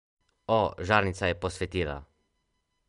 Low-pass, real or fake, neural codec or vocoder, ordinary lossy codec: 10.8 kHz; real; none; MP3, 64 kbps